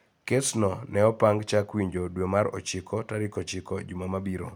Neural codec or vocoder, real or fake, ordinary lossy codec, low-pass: none; real; none; none